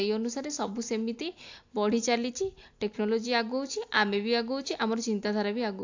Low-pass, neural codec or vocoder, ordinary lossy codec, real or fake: 7.2 kHz; none; AAC, 48 kbps; real